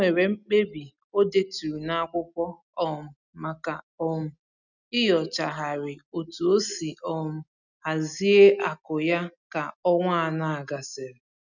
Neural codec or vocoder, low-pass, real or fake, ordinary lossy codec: none; 7.2 kHz; real; none